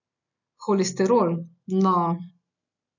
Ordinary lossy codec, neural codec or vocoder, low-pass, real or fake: none; none; 7.2 kHz; real